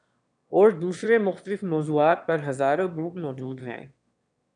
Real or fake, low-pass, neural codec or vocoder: fake; 9.9 kHz; autoencoder, 22.05 kHz, a latent of 192 numbers a frame, VITS, trained on one speaker